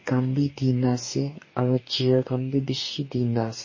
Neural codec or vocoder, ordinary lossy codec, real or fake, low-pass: codec, 44.1 kHz, 2.6 kbps, DAC; MP3, 32 kbps; fake; 7.2 kHz